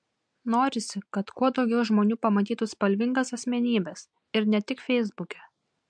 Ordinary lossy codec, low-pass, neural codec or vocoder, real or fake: MP3, 64 kbps; 9.9 kHz; none; real